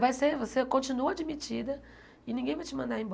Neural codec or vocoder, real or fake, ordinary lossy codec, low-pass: none; real; none; none